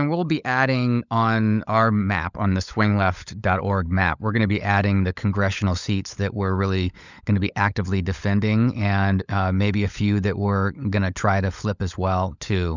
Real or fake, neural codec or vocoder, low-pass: fake; codec, 16 kHz, 16 kbps, FunCodec, trained on LibriTTS, 50 frames a second; 7.2 kHz